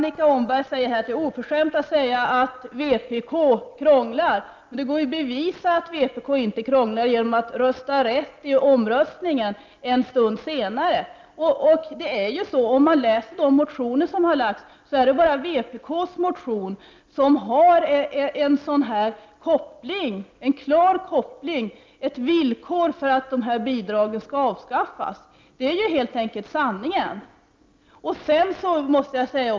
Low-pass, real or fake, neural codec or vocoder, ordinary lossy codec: 7.2 kHz; real; none; Opus, 24 kbps